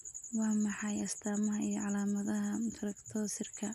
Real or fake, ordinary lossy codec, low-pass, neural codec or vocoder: real; none; 14.4 kHz; none